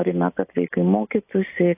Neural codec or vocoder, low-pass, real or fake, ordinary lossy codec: none; 3.6 kHz; real; AAC, 32 kbps